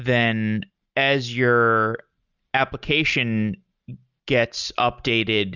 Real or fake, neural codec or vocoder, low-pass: real; none; 7.2 kHz